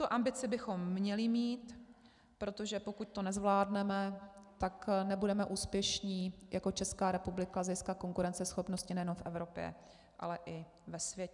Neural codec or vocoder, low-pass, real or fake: none; 10.8 kHz; real